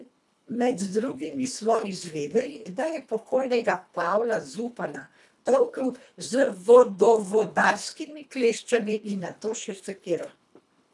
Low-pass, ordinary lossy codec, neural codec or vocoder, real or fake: none; none; codec, 24 kHz, 1.5 kbps, HILCodec; fake